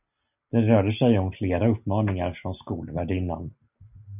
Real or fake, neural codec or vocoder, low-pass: real; none; 3.6 kHz